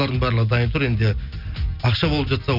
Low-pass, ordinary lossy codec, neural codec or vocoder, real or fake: 5.4 kHz; none; none; real